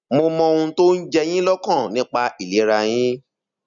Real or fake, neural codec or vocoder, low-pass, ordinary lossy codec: real; none; 7.2 kHz; none